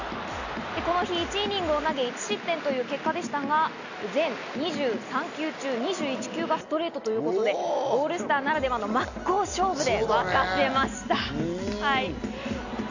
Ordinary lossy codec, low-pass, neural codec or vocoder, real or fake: none; 7.2 kHz; none; real